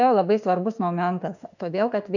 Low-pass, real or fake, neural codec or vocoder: 7.2 kHz; fake; autoencoder, 48 kHz, 32 numbers a frame, DAC-VAE, trained on Japanese speech